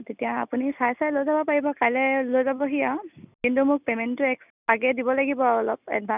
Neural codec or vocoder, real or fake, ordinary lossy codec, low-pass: none; real; none; 3.6 kHz